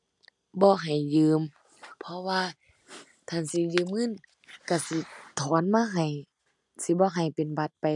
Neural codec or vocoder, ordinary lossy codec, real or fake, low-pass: none; none; real; 10.8 kHz